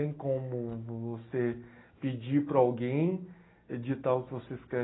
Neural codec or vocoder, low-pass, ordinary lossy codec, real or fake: none; 7.2 kHz; AAC, 16 kbps; real